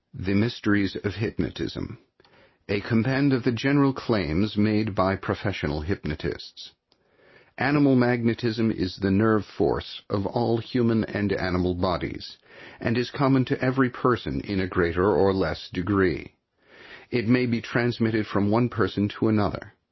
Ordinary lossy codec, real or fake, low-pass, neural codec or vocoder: MP3, 24 kbps; real; 7.2 kHz; none